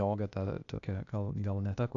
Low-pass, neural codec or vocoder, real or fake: 7.2 kHz; codec, 16 kHz, 0.8 kbps, ZipCodec; fake